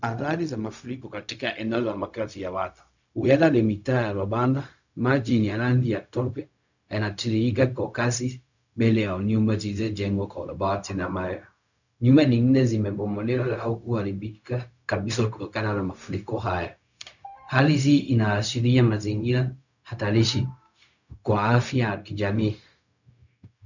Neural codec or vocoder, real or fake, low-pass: codec, 16 kHz, 0.4 kbps, LongCat-Audio-Codec; fake; 7.2 kHz